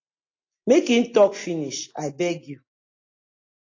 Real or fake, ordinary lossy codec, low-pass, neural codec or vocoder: real; AAC, 32 kbps; 7.2 kHz; none